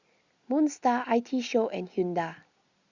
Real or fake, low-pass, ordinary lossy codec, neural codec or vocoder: real; 7.2 kHz; Opus, 64 kbps; none